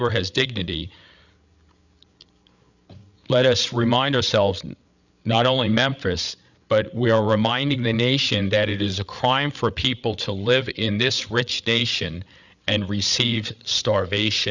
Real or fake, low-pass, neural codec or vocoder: fake; 7.2 kHz; codec, 16 kHz, 8 kbps, FreqCodec, larger model